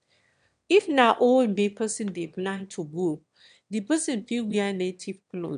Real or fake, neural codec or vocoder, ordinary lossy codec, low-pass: fake; autoencoder, 22.05 kHz, a latent of 192 numbers a frame, VITS, trained on one speaker; none; 9.9 kHz